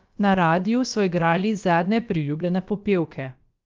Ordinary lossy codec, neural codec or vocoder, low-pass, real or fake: Opus, 32 kbps; codec, 16 kHz, about 1 kbps, DyCAST, with the encoder's durations; 7.2 kHz; fake